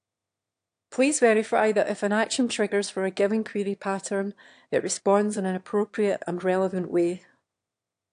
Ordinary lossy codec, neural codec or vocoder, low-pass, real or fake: AAC, 64 kbps; autoencoder, 22.05 kHz, a latent of 192 numbers a frame, VITS, trained on one speaker; 9.9 kHz; fake